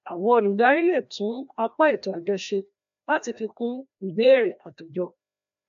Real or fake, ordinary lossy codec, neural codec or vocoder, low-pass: fake; AAC, 64 kbps; codec, 16 kHz, 1 kbps, FreqCodec, larger model; 7.2 kHz